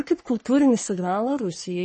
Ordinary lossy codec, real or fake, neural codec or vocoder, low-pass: MP3, 32 kbps; fake; codec, 44.1 kHz, 3.4 kbps, Pupu-Codec; 10.8 kHz